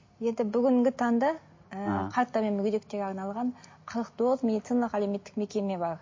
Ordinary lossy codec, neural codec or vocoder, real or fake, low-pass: MP3, 32 kbps; none; real; 7.2 kHz